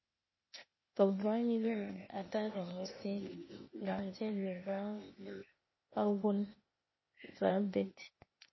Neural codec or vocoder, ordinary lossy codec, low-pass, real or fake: codec, 16 kHz, 0.8 kbps, ZipCodec; MP3, 24 kbps; 7.2 kHz; fake